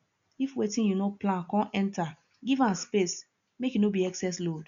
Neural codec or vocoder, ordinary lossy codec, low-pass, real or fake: none; none; 7.2 kHz; real